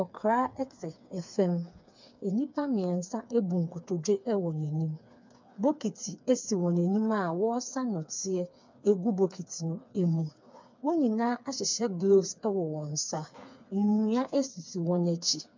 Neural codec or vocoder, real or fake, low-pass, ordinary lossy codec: codec, 16 kHz, 4 kbps, FreqCodec, smaller model; fake; 7.2 kHz; MP3, 64 kbps